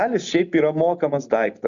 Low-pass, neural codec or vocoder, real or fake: 7.2 kHz; none; real